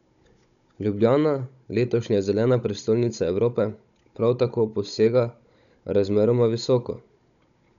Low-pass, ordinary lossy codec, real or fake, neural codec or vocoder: 7.2 kHz; none; fake; codec, 16 kHz, 16 kbps, FunCodec, trained on Chinese and English, 50 frames a second